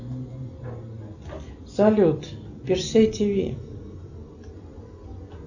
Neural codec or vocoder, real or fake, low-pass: none; real; 7.2 kHz